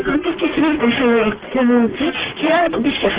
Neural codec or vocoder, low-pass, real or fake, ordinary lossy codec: codec, 44.1 kHz, 1.7 kbps, Pupu-Codec; 3.6 kHz; fake; Opus, 16 kbps